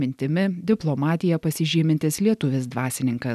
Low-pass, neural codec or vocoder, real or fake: 14.4 kHz; none; real